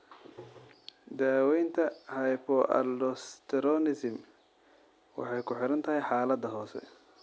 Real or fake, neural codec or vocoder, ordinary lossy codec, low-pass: real; none; none; none